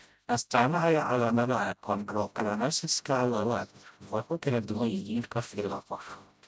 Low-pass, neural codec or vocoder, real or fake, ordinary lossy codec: none; codec, 16 kHz, 0.5 kbps, FreqCodec, smaller model; fake; none